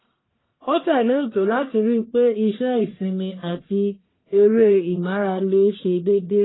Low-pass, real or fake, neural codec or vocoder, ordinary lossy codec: 7.2 kHz; fake; codec, 44.1 kHz, 1.7 kbps, Pupu-Codec; AAC, 16 kbps